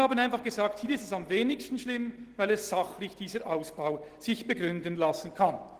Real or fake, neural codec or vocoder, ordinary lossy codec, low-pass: real; none; Opus, 16 kbps; 14.4 kHz